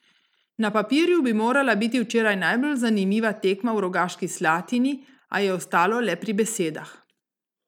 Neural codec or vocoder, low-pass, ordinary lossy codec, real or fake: none; 19.8 kHz; none; real